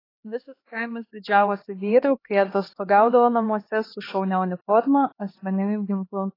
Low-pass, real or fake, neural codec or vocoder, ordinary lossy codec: 5.4 kHz; fake; codec, 16 kHz, 2 kbps, X-Codec, HuBERT features, trained on LibriSpeech; AAC, 24 kbps